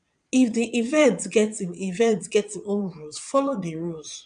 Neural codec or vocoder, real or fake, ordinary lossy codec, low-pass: vocoder, 22.05 kHz, 80 mel bands, Vocos; fake; none; 9.9 kHz